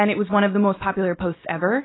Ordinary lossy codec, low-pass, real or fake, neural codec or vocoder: AAC, 16 kbps; 7.2 kHz; real; none